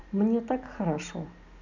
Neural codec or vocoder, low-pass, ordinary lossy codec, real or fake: none; 7.2 kHz; none; real